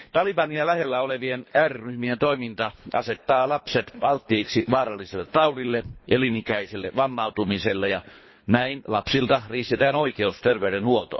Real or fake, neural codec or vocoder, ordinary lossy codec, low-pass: fake; codec, 24 kHz, 3 kbps, HILCodec; MP3, 24 kbps; 7.2 kHz